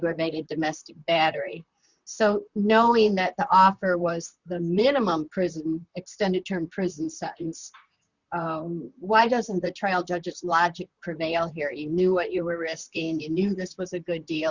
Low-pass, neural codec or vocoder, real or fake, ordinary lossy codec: 7.2 kHz; vocoder, 44.1 kHz, 128 mel bands, Pupu-Vocoder; fake; Opus, 64 kbps